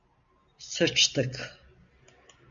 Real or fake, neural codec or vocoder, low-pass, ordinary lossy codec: real; none; 7.2 kHz; MP3, 64 kbps